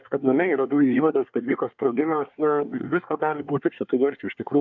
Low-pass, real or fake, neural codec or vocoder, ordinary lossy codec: 7.2 kHz; fake; codec, 24 kHz, 1 kbps, SNAC; MP3, 64 kbps